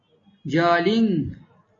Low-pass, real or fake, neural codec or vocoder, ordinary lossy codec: 7.2 kHz; real; none; AAC, 32 kbps